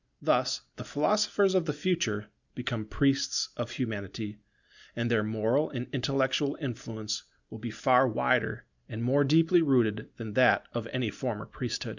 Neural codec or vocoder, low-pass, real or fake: none; 7.2 kHz; real